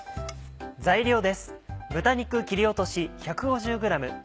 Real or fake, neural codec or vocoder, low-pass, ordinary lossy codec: real; none; none; none